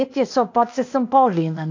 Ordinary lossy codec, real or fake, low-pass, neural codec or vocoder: AAC, 48 kbps; fake; 7.2 kHz; codec, 16 kHz, 0.8 kbps, ZipCodec